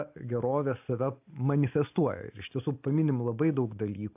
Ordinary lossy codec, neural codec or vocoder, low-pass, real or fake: AAC, 32 kbps; none; 3.6 kHz; real